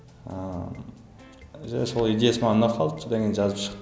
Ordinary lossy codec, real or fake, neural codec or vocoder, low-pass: none; real; none; none